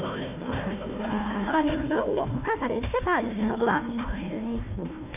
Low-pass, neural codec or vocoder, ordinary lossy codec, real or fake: 3.6 kHz; codec, 16 kHz, 1 kbps, FunCodec, trained on Chinese and English, 50 frames a second; none; fake